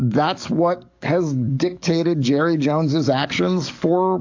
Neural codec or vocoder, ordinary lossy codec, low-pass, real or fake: codec, 44.1 kHz, 7.8 kbps, DAC; AAC, 48 kbps; 7.2 kHz; fake